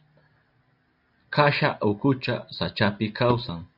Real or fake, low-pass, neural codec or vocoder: real; 5.4 kHz; none